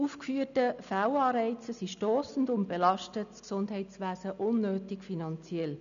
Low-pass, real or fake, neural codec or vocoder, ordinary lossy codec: 7.2 kHz; real; none; none